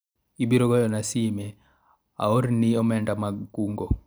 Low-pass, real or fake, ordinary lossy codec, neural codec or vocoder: none; real; none; none